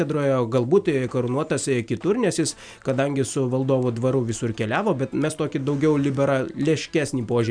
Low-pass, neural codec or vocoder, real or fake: 9.9 kHz; none; real